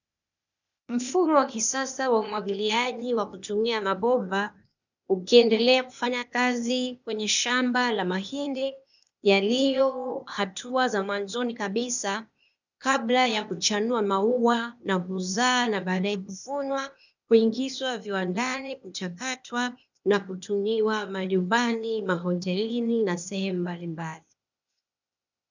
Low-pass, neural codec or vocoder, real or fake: 7.2 kHz; codec, 16 kHz, 0.8 kbps, ZipCodec; fake